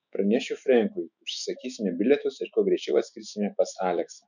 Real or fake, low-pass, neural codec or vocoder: fake; 7.2 kHz; autoencoder, 48 kHz, 128 numbers a frame, DAC-VAE, trained on Japanese speech